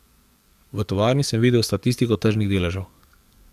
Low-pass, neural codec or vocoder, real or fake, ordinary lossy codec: 14.4 kHz; codec, 44.1 kHz, 7.8 kbps, DAC; fake; Opus, 64 kbps